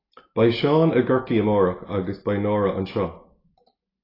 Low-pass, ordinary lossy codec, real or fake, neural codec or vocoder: 5.4 kHz; AAC, 24 kbps; real; none